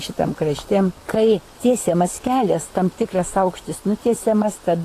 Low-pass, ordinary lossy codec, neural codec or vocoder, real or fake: 14.4 kHz; AAC, 48 kbps; vocoder, 44.1 kHz, 128 mel bands, Pupu-Vocoder; fake